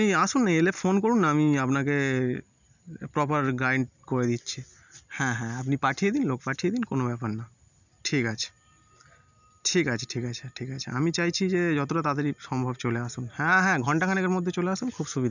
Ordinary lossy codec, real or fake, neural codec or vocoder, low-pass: none; real; none; 7.2 kHz